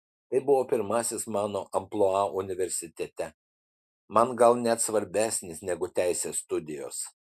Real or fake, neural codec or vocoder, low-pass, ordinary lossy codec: real; none; 14.4 kHz; MP3, 96 kbps